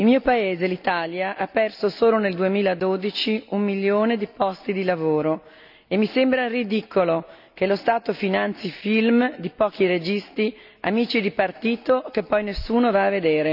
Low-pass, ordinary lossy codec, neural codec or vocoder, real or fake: 5.4 kHz; none; none; real